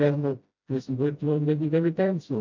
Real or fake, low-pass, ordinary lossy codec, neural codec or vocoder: fake; 7.2 kHz; MP3, 48 kbps; codec, 16 kHz, 0.5 kbps, FreqCodec, smaller model